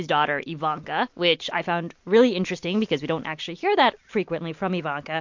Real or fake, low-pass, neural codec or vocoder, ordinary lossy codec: real; 7.2 kHz; none; MP3, 48 kbps